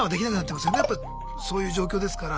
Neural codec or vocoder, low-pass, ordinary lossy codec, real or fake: none; none; none; real